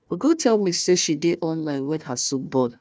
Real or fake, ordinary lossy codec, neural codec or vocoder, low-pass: fake; none; codec, 16 kHz, 1 kbps, FunCodec, trained on Chinese and English, 50 frames a second; none